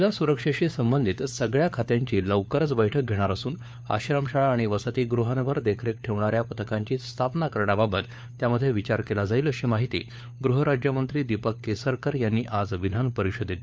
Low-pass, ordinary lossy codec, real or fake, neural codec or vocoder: none; none; fake; codec, 16 kHz, 4 kbps, FunCodec, trained on LibriTTS, 50 frames a second